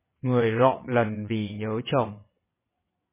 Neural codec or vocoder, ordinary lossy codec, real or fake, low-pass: vocoder, 22.05 kHz, 80 mel bands, WaveNeXt; MP3, 16 kbps; fake; 3.6 kHz